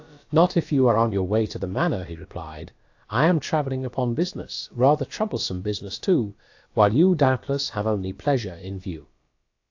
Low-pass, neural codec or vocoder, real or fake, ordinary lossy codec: 7.2 kHz; codec, 16 kHz, about 1 kbps, DyCAST, with the encoder's durations; fake; AAC, 48 kbps